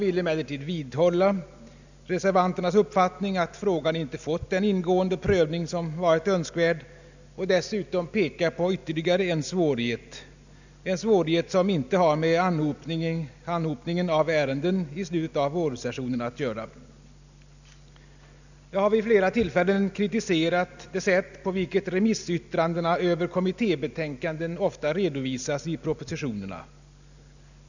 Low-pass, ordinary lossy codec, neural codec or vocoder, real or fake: 7.2 kHz; none; none; real